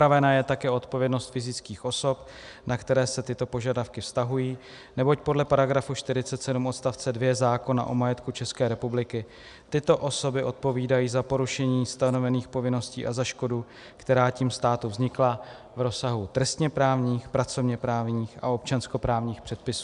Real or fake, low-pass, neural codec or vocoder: real; 10.8 kHz; none